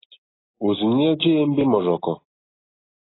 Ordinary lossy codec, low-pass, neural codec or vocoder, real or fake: AAC, 16 kbps; 7.2 kHz; none; real